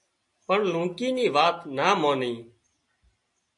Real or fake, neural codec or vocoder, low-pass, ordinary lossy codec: real; none; 10.8 kHz; MP3, 48 kbps